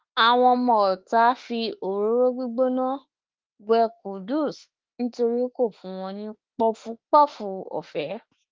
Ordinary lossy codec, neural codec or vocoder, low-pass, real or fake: Opus, 32 kbps; autoencoder, 48 kHz, 32 numbers a frame, DAC-VAE, trained on Japanese speech; 7.2 kHz; fake